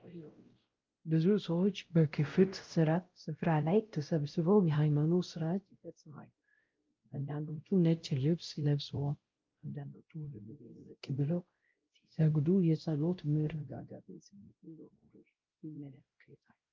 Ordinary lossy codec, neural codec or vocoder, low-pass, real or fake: Opus, 32 kbps; codec, 16 kHz, 0.5 kbps, X-Codec, WavLM features, trained on Multilingual LibriSpeech; 7.2 kHz; fake